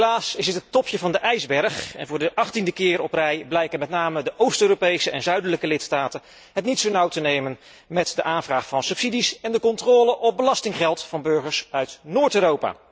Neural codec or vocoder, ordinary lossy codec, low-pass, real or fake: none; none; none; real